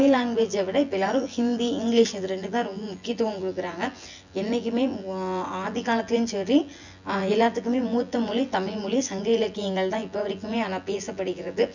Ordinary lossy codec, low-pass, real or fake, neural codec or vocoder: none; 7.2 kHz; fake; vocoder, 24 kHz, 100 mel bands, Vocos